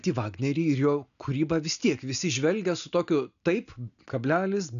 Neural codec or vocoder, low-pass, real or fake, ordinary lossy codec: none; 7.2 kHz; real; MP3, 96 kbps